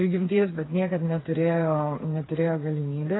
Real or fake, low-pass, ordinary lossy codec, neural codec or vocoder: fake; 7.2 kHz; AAC, 16 kbps; codec, 16 kHz, 4 kbps, FreqCodec, smaller model